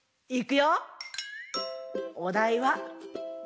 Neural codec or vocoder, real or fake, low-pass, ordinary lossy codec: none; real; none; none